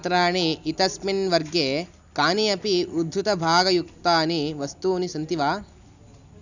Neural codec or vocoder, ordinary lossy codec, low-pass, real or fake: none; none; 7.2 kHz; real